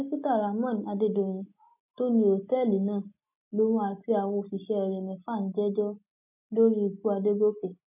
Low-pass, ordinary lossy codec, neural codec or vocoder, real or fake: 3.6 kHz; none; none; real